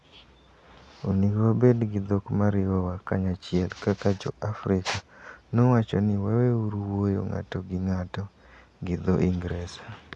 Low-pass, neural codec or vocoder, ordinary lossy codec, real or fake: none; none; none; real